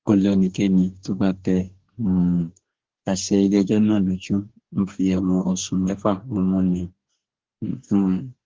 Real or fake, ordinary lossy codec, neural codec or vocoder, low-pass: fake; Opus, 16 kbps; codec, 44.1 kHz, 2.6 kbps, DAC; 7.2 kHz